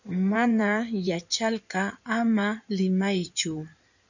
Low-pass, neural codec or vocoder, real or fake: 7.2 kHz; codec, 16 kHz in and 24 kHz out, 2.2 kbps, FireRedTTS-2 codec; fake